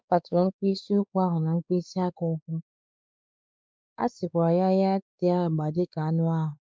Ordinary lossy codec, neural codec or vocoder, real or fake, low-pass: none; codec, 16 kHz, 4 kbps, X-Codec, WavLM features, trained on Multilingual LibriSpeech; fake; none